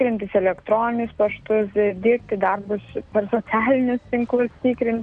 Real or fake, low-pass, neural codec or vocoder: real; 9.9 kHz; none